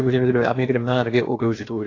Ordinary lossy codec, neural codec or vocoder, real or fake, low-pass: AAC, 32 kbps; codec, 16 kHz, about 1 kbps, DyCAST, with the encoder's durations; fake; 7.2 kHz